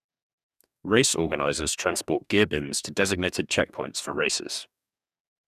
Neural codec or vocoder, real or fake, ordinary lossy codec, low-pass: codec, 44.1 kHz, 2.6 kbps, DAC; fake; none; 14.4 kHz